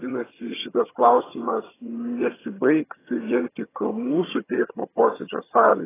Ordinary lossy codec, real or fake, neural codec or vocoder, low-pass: AAC, 16 kbps; fake; vocoder, 22.05 kHz, 80 mel bands, HiFi-GAN; 3.6 kHz